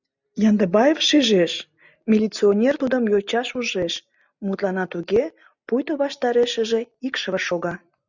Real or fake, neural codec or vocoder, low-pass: real; none; 7.2 kHz